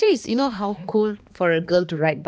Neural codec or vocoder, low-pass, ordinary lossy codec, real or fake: codec, 16 kHz, 2 kbps, X-Codec, HuBERT features, trained on balanced general audio; none; none; fake